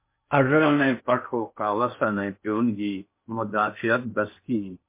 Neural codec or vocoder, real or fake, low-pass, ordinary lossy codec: codec, 16 kHz in and 24 kHz out, 0.6 kbps, FocalCodec, streaming, 4096 codes; fake; 3.6 kHz; MP3, 24 kbps